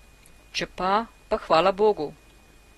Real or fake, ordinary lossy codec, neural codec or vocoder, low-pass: real; AAC, 32 kbps; none; 19.8 kHz